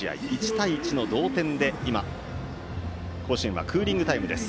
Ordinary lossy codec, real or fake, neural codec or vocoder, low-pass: none; real; none; none